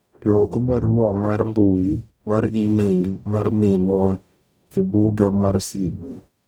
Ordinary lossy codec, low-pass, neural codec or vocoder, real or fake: none; none; codec, 44.1 kHz, 0.9 kbps, DAC; fake